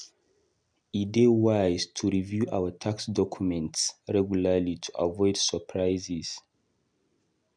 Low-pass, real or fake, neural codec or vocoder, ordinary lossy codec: 9.9 kHz; real; none; none